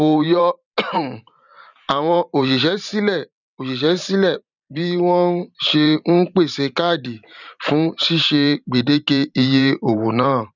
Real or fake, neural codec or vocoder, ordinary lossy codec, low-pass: fake; vocoder, 44.1 kHz, 128 mel bands every 512 samples, BigVGAN v2; none; 7.2 kHz